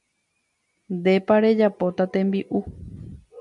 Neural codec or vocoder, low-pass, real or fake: none; 10.8 kHz; real